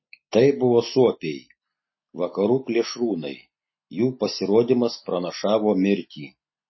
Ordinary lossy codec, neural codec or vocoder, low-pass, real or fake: MP3, 24 kbps; none; 7.2 kHz; real